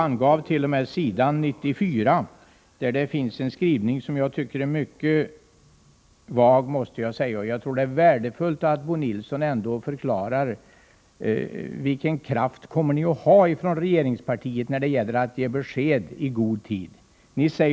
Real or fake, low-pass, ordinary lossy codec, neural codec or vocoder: real; none; none; none